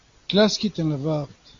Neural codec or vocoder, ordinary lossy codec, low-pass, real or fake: none; AAC, 48 kbps; 7.2 kHz; real